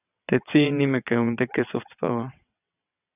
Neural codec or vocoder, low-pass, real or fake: vocoder, 22.05 kHz, 80 mel bands, WaveNeXt; 3.6 kHz; fake